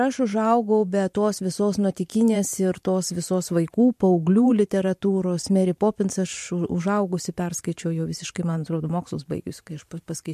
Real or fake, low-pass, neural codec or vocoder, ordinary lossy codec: fake; 14.4 kHz; vocoder, 44.1 kHz, 128 mel bands every 512 samples, BigVGAN v2; MP3, 64 kbps